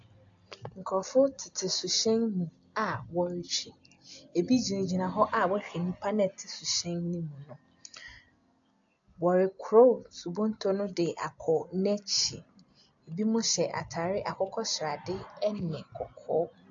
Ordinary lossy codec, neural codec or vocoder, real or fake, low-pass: AAC, 64 kbps; none; real; 7.2 kHz